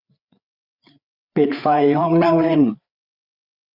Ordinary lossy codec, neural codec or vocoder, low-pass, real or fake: none; codec, 16 kHz, 4 kbps, FreqCodec, larger model; 5.4 kHz; fake